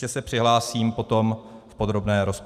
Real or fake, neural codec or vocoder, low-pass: fake; vocoder, 44.1 kHz, 128 mel bands every 256 samples, BigVGAN v2; 14.4 kHz